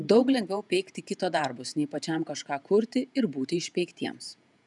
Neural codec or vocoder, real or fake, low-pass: vocoder, 48 kHz, 128 mel bands, Vocos; fake; 10.8 kHz